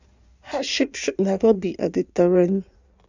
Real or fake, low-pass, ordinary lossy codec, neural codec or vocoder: fake; 7.2 kHz; none; codec, 16 kHz in and 24 kHz out, 1.1 kbps, FireRedTTS-2 codec